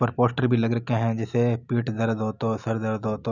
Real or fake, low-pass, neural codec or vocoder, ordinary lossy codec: real; 7.2 kHz; none; none